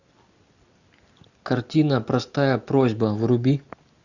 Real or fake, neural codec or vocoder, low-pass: real; none; 7.2 kHz